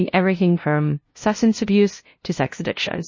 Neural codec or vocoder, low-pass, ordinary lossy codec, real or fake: codec, 16 kHz, 0.5 kbps, FunCodec, trained on LibriTTS, 25 frames a second; 7.2 kHz; MP3, 32 kbps; fake